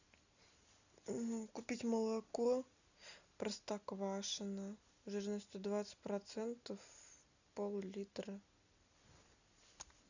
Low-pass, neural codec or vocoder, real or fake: 7.2 kHz; none; real